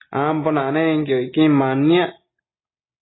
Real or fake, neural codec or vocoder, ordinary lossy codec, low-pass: real; none; AAC, 16 kbps; 7.2 kHz